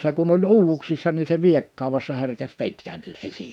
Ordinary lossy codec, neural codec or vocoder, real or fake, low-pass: none; autoencoder, 48 kHz, 32 numbers a frame, DAC-VAE, trained on Japanese speech; fake; 19.8 kHz